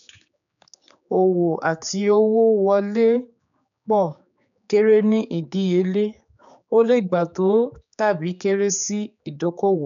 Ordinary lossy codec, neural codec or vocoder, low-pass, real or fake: none; codec, 16 kHz, 4 kbps, X-Codec, HuBERT features, trained on general audio; 7.2 kHz; fake